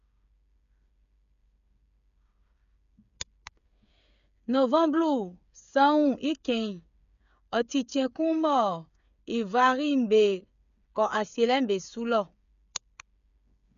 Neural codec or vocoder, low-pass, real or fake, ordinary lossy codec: codec, 16 kHz, 8 kbps, FreqCodec, smaller model; 7.2 kHz; fake; none